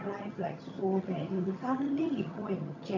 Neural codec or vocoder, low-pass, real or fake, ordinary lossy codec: vocoder, 22.05 kHz, 80 mel bands, HiFi-GAN; 7.2 kHz; fake; none